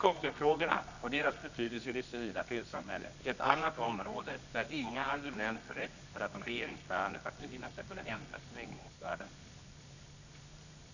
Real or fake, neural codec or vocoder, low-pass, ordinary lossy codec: fake; codec, 24 kHz, 0.9 kbps, WavTokenizer, medium music audio release; 7.2 kHz; none